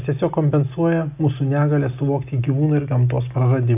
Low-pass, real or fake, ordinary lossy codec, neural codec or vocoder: 3.6 kHz; real; AAC, 32 kbps; none